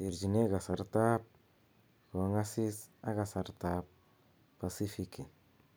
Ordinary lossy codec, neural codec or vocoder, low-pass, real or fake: none; none; none; real